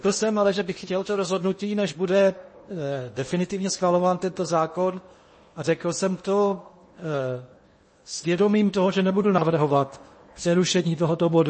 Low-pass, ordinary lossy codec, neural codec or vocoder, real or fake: 9.9 kHz; MP3, 32 kbps; codec, 16 kHz in and 24 kHz out, 0.8 kbps, FocalCodec, streaming, 65536 codes; fake